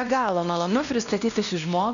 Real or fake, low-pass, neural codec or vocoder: fake; 7.2 kHz; codec, 16 kHz, 1 kbps, X-Codec, WavLM features, trained on Multilingual LibriSpeech